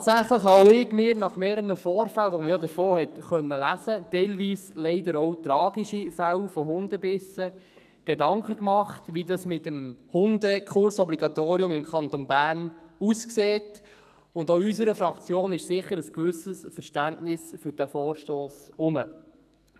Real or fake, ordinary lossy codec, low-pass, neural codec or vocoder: fake; none; 14.4 kHz; codec, 44.1 kHz, 2.6 kbps, SNAC